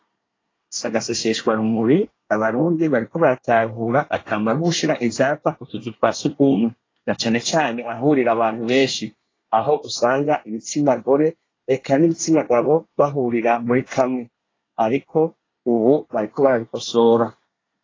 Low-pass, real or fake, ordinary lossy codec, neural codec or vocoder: 7.2 kHz; fake; AAC, 32 kbps; codec, 24 kHz, 1 kbps, SNAC